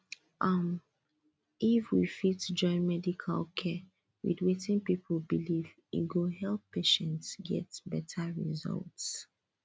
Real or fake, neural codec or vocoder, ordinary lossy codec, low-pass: real; none; none; none